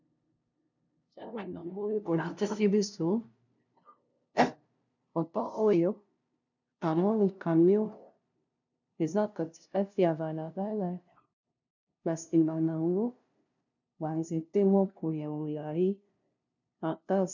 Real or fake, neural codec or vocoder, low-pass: fake; codec, 16 kHz, 0.5 kbps, FunCodec, trained on LibriTTS, 25 frames a second; 7.2 kHz